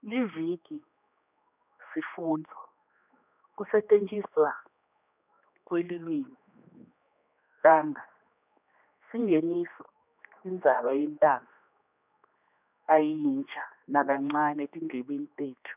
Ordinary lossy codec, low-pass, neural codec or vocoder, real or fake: none; 3.6 kHz; codec, 16 kHz, 2 kbps, X-Codec, HuBERT features, trained on general audio; fake